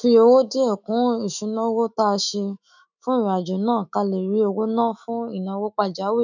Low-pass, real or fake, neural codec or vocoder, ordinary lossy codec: 7.2 kHz; fake; autoencoder, 48 kHz, 128 numbers a frame, DAC-VAE, trained on Japanese speech; none